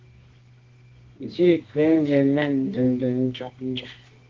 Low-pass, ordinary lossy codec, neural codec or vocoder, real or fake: 7.2 kHz; Opus, 16 kbps; codec, 24 kHz, 0.9 kbps, WavTokenizer, medium music audio release; fake